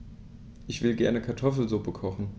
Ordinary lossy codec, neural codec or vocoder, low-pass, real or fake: none; none; none; real